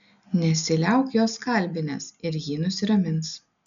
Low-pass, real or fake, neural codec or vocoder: 7.2 kHz; real; none